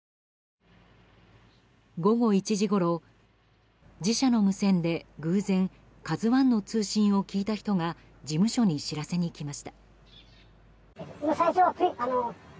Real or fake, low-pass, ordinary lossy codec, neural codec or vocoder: real; none; none; none